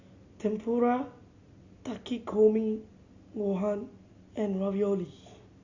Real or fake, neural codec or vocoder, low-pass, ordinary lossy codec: real; none; 7.2 kHz; Opus, 64 kbps